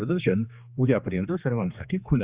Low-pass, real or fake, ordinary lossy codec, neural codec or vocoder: 3.6 kHz; fake; Opus, 64 kbps; codec, 16 kHz, 2 kbps, X-Codec, HuBERT features, trained on general audio